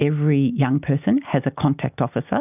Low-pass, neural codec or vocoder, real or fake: 3.6 kHz; none; real